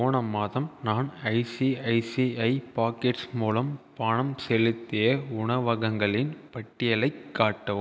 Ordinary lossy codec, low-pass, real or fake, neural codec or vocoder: none; none; real; none